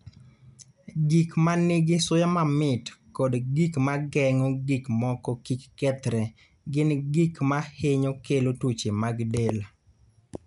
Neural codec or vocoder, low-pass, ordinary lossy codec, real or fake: none; 10.8 kHz; none; real